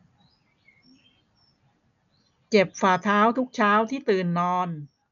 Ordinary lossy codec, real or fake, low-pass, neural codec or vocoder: none; real; 7.2 kHz; none